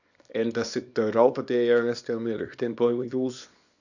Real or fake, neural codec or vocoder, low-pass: fake; codec, 24 kHz, 0.9 kbps, WavTokenizer, small release; 7.2 kHz